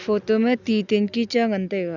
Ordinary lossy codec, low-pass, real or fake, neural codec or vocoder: none; 7.2 kHz; real; none